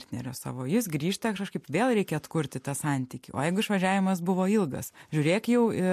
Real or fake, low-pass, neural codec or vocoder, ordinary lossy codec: real; 14.4 kHz; none; MP3, 64 kbps